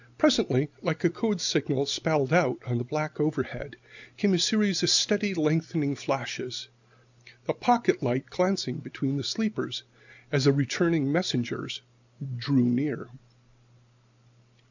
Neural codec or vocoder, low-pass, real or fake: none; 7.2 kHz; real